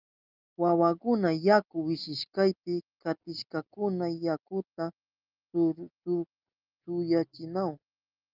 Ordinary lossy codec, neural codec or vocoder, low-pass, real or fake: Opus, 32 kbps; none; 5.4 kHz; real